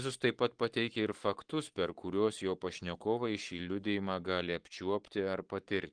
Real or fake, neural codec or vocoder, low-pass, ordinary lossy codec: fake; codec, 44.1 kHz, 7.8 kbps, Pupu-Codec; 9.9 kHz; Opus, 24 kbps